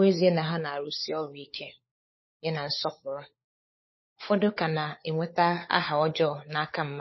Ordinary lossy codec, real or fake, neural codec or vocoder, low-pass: MP3, 24 kbps; fake; codec, 16 kHz, 16 kbps, FunCodec, trained on LibriTTS, 50 frames a second; 7.2 kHz